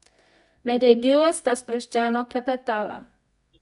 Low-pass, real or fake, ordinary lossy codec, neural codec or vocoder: 10.8 kHz; fake; none; codec, 24 kHz, 0.9 kbps, WavTokenizer, medium music audio release